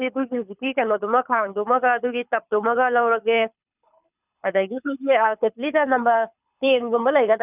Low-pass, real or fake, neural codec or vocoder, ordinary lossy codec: 3.6 kHz; fake; codec, 24 kHz, 6 kbps, HILCodec; none